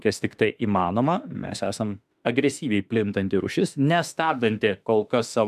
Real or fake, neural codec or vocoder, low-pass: fake; autoencoder, 48 kHz, 32 numbers a frame, DAC-VAE, trained on Japanese speech; 14.4 kHz